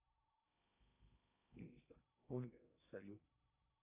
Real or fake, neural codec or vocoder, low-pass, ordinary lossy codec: fake; codec, 16 kHz in and 24 kHz out, 0.6 kbps, FocalCodec, streaming, 4096 codes; 3.6 kHz; AAC, 32 kbps